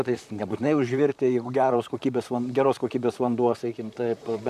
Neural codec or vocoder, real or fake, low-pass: vocoder, 44.1 kHz, 128 mel bands, Pupu-Vocoder; fake; 14.4 kHz